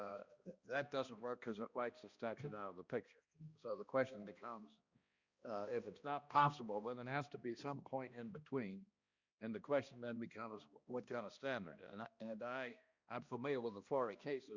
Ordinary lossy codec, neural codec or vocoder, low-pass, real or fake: Opus, 64 kbps; codec, 16 kHz, 1 kbps, X-Codec, HuBERT features, trained on balanced general audio; 7.2 kHz; fake